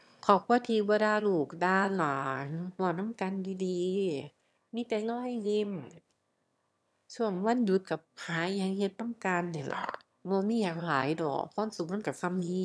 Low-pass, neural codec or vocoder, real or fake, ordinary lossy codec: none; autoencoder, 22.05 kHz, a latent of 192 numbers a frame, VITS, trained on one speaker; fake; none